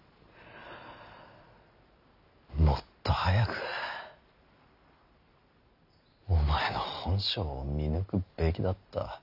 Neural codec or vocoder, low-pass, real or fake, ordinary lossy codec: none; 5.4 kHz; real; none